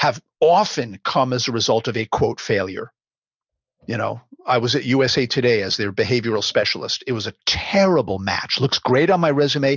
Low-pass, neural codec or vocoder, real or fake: 7.2 kHz; none; real